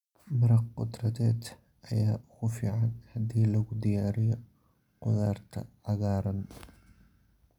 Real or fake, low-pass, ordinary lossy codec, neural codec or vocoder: real; 19.8 kHz; none; none